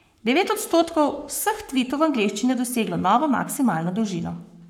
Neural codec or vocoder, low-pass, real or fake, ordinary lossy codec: codec, 44.1 kHz, 7.8 kbps, Pupu-Codec; 19.8 kHz; fake; none